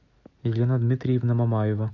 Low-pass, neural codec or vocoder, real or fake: 7.2 kHz; none; real